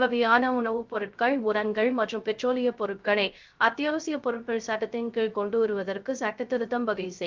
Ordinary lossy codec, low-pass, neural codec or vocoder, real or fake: Opus, 24 kbps; 7.2 kHz; codec, 16 kHz, 0.3 kbps, FocalCodec; fake